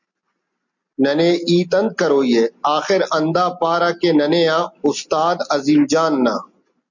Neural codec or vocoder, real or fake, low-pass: none; real; 7.2 kHz